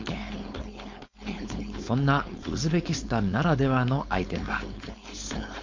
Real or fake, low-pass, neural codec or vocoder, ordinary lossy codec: fake; 7.2 kHz; codec, 16 kHz, 4.8 kbps, FACodec; MP3, 48 kbps